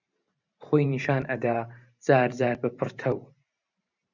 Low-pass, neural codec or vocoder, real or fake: 7.2 kHz; vocoder, 44.1 kHz, 128 mel bands every 512 samples, BigVGAN v2; fake